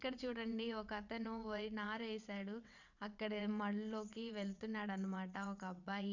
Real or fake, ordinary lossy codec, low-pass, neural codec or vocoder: fake; none; 7.2 kHz; vocoder, 22.05 kHz, 80 mel bands, WaveNeXt